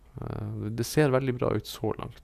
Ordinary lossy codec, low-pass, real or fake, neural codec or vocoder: none; 14.4 kHz; real; none